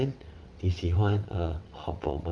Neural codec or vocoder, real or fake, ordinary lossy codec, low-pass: vocoder, 22.05 kHz, 80 mel bands, WaveNeXt; fake; none; none